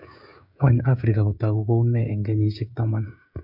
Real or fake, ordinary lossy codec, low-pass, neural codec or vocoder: fake; none; 5.4 kHz; codec, 16 kHz, 16 kbps, FreqCodec, smaller model